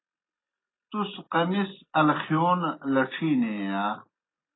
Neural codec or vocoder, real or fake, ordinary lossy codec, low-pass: none; real; AAC, 16 kbps; 7.2 kHz